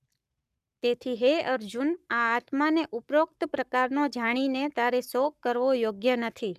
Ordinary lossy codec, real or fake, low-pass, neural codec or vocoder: none; fake; 14.4 kHz; codec, 44.1 kHz, 7.8 kbps, Pupu-Codec